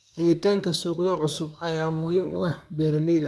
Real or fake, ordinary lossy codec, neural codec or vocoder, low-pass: fake; none; codec, 24 kHz, 1 kbps, SNAC; none